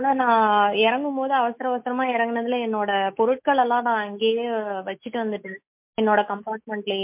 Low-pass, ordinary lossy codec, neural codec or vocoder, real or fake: 3.6 kHz; MP3, 32 kbps; autoencoder, 48 kHz, 128 numbers a frame, DAC-VAE, trained on Japanese speech; fake